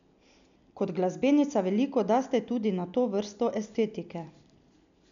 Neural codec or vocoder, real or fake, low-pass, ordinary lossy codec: none; real; 7.2 kHz; none